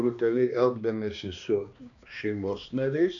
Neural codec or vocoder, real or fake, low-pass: codec, 16 kHz, 2 kbps, X-Codec, HuBERT features, trained on balanced general audio; fake; 7.2 kHz